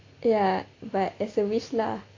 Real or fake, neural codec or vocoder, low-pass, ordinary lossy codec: real; none; 7.2 kHz; AAC, 32 kbps